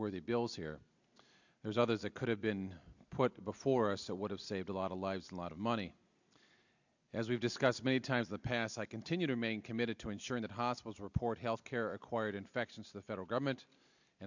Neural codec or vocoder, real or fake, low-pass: none; real; 7.2 kHz